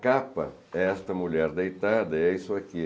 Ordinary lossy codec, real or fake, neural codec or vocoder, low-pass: none; real; none; none